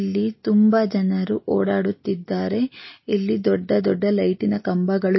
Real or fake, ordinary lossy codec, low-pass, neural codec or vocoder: real; MP3, 24 kbps; 7.2 kHz; none